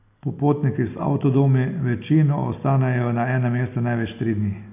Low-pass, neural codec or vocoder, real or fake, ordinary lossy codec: 3.6 kHz; none; real; AAC, 32 kbps